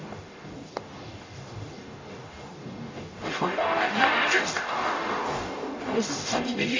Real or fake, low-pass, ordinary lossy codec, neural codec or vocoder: fake; 7.2 kHz; AAC, 32 kbps; codec, 44.1 kHz, 0.9 kbps, DAC